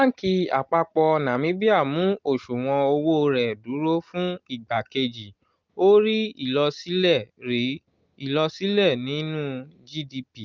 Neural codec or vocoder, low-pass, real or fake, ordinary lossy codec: none; 7.2 kHz; real; Opus, 24 kbps